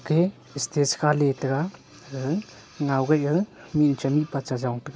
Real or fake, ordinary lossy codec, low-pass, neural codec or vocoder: real; none; none; none